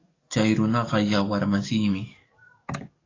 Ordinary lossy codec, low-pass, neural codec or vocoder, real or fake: AAC, 32 kbps; 7.2 kHz; codec, 44.1 kHz, 7.8 kbps, DAC; fake